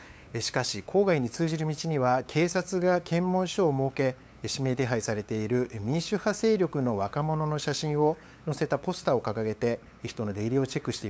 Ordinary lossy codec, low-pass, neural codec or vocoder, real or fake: none; none; codec, 16 kHz, 8 kbps, FunCodec, trained on LibriTTS, 25 frames a second; fake